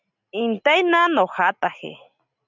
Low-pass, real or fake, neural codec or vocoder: 7.2 kHz; real; none